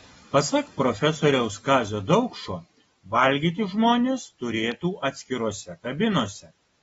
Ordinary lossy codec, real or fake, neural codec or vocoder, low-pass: AAC, 24 kbps; real; none; 19.8 kHz